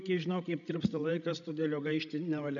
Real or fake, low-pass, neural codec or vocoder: fake; 7.2 kHz; codec, 16 kHz, 16 kbps, FreqCodec, larger model